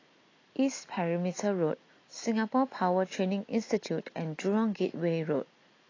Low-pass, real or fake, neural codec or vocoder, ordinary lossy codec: 7.2 kHz; real; none; AAC, 32 kbps